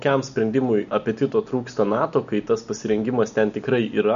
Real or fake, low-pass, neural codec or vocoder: real; 7.2 kHz; none